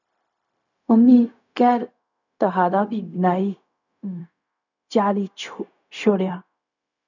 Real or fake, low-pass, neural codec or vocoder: fake; 7.2 kHz; codec, 16 kHz, 0.4 kbps, LongCat-Audio-Codec